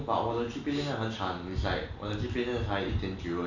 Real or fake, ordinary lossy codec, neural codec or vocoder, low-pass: real; none; none; 7.2 kHz